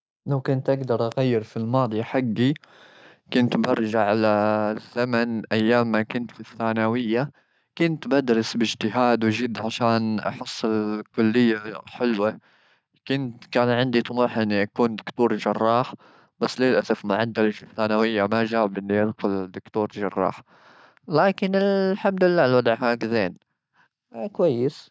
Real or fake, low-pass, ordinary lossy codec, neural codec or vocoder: real; none; none; none